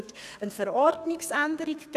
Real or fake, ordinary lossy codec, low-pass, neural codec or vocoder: fake; none; 14.4 kHz; codec, 32 kHz, 1.9 kbps, SNAC